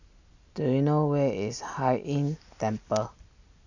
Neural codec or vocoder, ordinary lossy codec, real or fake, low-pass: none; none; real; 7.2 kHz